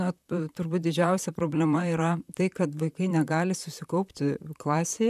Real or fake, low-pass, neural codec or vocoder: fake; 14.4 kHz; vocoder, 44.1 kHz, 128 mel bands, Pupu-Vocoder